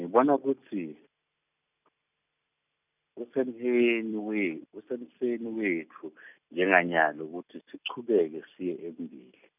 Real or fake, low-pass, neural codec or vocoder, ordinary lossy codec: real; 3.6 kHz; none; none